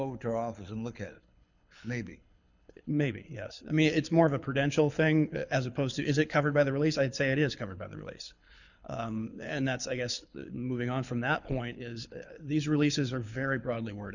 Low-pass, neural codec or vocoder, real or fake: 7.2 kHz; codec, 24 kHz, 6 kbps, HILCodec; fake